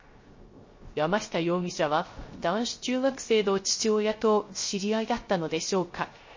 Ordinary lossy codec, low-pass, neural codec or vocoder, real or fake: MP3, 32 kbps; 7.2 kHz; codec, 16 kHz, 0.3 kbps, FocalCodec; fake